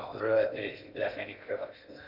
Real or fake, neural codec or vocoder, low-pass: fake; codec, 16 kHz in and 24 kHz out, 0.6 kbps, FocalCodec, streaming, 2048 codes; 5.4 kHz